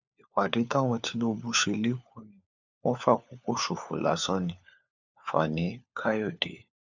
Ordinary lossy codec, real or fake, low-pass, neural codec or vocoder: none; fake; 7.2 kHz; codec, 16 kHz, 4 kbps, FunCodec, trained on LibriTTS, 50 frames a second